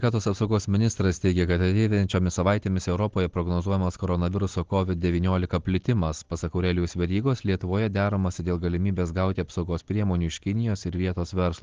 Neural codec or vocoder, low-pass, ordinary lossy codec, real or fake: none; 7.2 kHz; Opus, 16 kbps; real